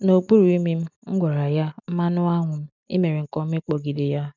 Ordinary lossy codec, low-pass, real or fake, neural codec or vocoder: none; 7.2 kHz; real; none